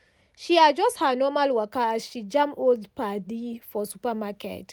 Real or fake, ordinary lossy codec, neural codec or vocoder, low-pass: real; none; none; none